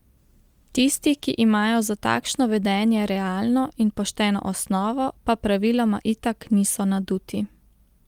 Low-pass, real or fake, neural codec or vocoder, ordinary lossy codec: 19.8 kHz; real; none; Opus, 32 kbps